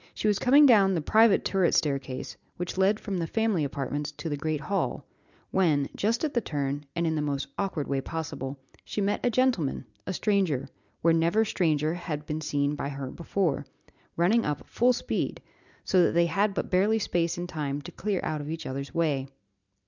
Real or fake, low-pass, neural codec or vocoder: real; 7.2 kHz; none